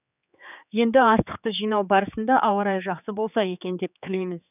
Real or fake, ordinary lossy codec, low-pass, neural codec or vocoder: fake; none; 3.6 kHz; codec, 16 kHz, 4 kbps, X-Codec, HuBERT features, trained on general audio